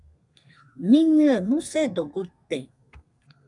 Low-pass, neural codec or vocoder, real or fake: 10.8 kHz; codec, 32 kHz, 1.9 kbps, SNAC; fake